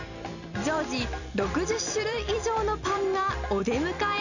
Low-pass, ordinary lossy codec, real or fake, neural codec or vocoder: 7.2 kHz; none; fake; vocoder, 44.1 kHz, 128 mel bands every 256 samples, BigVGAN v2